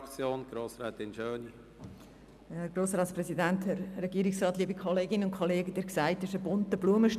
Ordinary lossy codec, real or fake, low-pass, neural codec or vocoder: none; real; 14.4 kHz; none